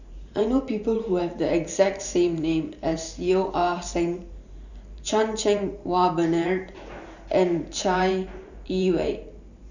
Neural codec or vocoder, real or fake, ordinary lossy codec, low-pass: vocoder, 44.1 kHz, 128 mel bands every 512 samples, BigVGAN v2; fake; none; 7.2 kHz